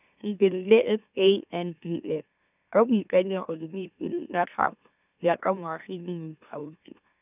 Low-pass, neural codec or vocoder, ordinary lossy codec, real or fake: 3.6 kHz; autoencoder, 44.1 kHz, a latent of 192 numbers a frame, MeloTTS; none; fake